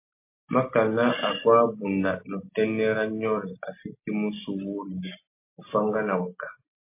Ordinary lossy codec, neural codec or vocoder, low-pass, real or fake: MP3, 24 kbps; none; 3.6 kHz; real